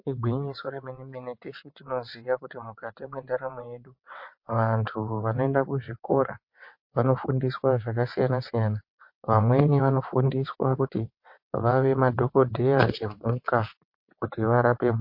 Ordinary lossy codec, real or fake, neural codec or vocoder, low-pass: MP3, 32 kbps; fake; vocoder, 22.05 kHz, 80 mel bands, WaveNeXt; 5.4 kHz